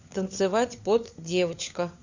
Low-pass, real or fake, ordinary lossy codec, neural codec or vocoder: 7.2 kHz; fake; Opus, 64 kbps; codec, 16 kHz, 4 kbps, FunCodec, trained on LibriTTS, 50 frames a second